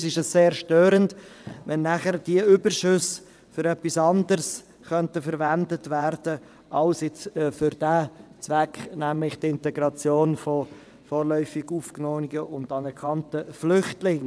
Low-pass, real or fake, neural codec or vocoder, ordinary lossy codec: none; fake; vocoder, 22.05 kHz, 80 mel bands, WaveNeXt; none